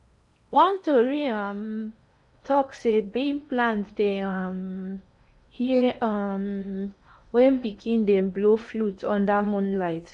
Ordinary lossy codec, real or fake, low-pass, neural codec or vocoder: none; fake; 10.8 kHz; codec, 16 kHz in and 24 kHz out, 0.8 kbps, FocalCodec, streaming, 65536 codes